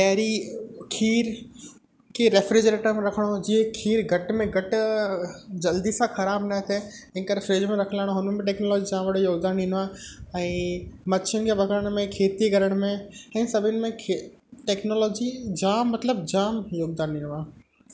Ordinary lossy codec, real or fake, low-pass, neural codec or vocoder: none; real; none; none